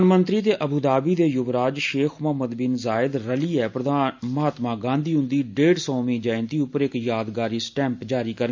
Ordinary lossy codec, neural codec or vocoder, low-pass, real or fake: MP3, 64 kbps; none; 7.2 kHz; real